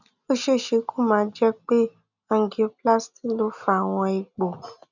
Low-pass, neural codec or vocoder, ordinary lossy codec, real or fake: 7.2 kHz; none; none; real